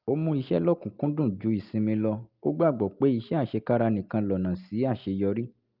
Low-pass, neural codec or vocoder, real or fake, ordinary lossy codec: 5.4 kHz; none; real; Opus, 32 kbps